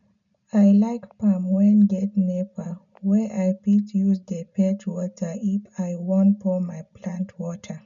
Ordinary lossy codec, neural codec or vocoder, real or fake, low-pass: none; none; real; 7.2 kHz